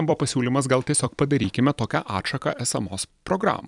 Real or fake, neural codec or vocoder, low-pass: fake; vocoder, 44.1 kHz, 128 mel bands every 256 samples, BigVGAN v2; 10.8 kHz